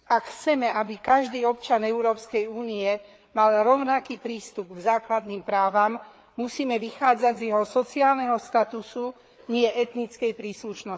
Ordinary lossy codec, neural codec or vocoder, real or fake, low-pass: none; codec, 16 kHz, 4 kbps, FreqCodec, larger model; fake; none